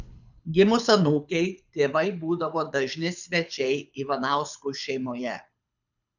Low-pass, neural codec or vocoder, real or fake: 7.2 kHz; codec, 24 kHz, 6 kbps, HILCodec; fake